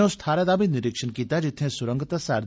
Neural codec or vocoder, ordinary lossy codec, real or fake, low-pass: none; none; real; none